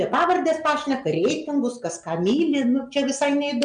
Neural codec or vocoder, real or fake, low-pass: none; real; 9.9 kHz